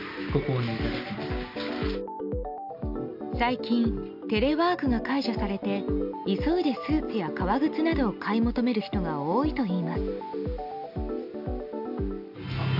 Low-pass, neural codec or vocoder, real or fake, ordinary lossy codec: 5.4 kHz; none; real; none